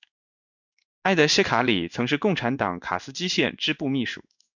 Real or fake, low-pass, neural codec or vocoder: fake; 7.2 kHz; codec, 16 kHz in and 24 kHz out, 1 kbps, XY-Tokenizer